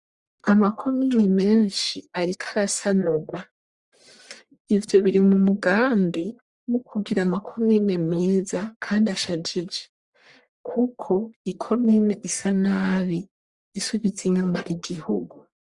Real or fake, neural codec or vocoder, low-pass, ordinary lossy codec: fake; codec, 44.1 kHz, 1.7 kbps, Pupu-Codec; 10.8 kHz; Opus, 64 kbps